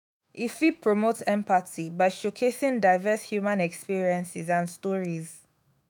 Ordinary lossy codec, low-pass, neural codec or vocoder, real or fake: none; none; autoencoder, 48 kHz, 128 numbers a frame, DAC-VAE, trained on Japanese speech; fake